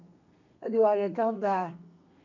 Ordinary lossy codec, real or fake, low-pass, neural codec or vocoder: none; fake; 7.2 kHz; codec, 32 kHz, 1.9 kbps, SNAC